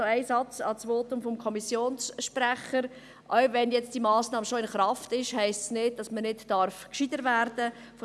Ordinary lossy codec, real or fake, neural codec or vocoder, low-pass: none; real; none; none